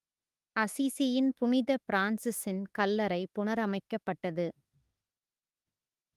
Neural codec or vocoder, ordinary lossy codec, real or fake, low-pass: autoencoder, 48 kHz, 32 numbers a frame, DAC-VAE, trained on Japanese speech; Opus, 32 kbps; fake; 14.4 kHz